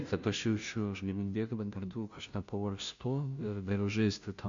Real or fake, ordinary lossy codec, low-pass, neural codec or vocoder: fake; AAC, 64 kbps; 7.2 kHz; codec, 16 kHz, 0.5 kbps, FunCodec, trained on Chinese and English, 25 frames a second